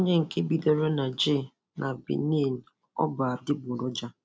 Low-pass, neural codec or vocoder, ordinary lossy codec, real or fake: none; none; none; real